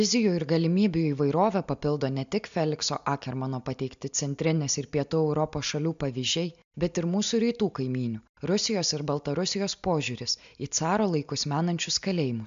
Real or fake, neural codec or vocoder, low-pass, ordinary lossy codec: real; none; 7.2 kHz; MP3, 64 kbps